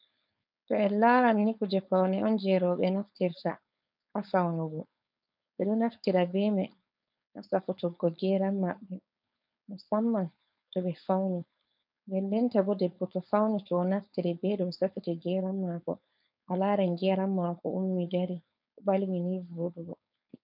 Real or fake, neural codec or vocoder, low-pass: fake; codec, 16 kHz, 4.8 kbps, FACodec; 5.4 kHz